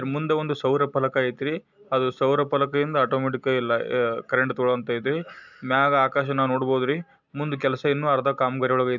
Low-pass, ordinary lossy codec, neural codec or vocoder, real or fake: 7.2 kHz; none; none; real